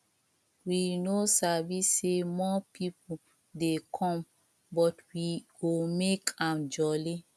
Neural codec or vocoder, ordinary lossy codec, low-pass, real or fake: none; none; none; real